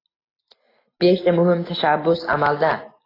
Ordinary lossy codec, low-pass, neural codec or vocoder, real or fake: AAC, 24 kbps; 5.4 kHz; none; real